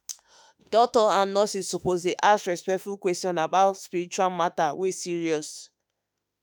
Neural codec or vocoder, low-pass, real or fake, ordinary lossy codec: autoencoder, 48 kHz, 32 numbers a frame, DAC-VAE, trained on Japanese speech; none; fake; none